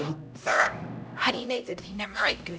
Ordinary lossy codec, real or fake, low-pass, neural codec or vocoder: none; fake; none; codec, 16 kHz, 0.5 kbps, X-Codec, HuBERT features, trained on LibriSpeech